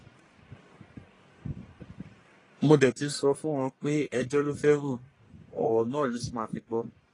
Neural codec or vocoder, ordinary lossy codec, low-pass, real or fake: codec, 44.1 kHz, 1.7 kbps, Pupu-Codec; AAC, 32 kbps; 10.8 kHz; fake